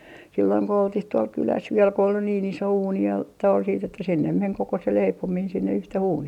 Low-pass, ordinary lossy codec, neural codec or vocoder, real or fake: 19.8 kHz; none; none; real